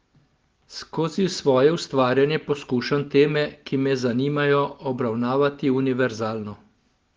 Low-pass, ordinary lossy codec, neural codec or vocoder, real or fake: 7.2 kHz; Opus, 16 kbps; none; real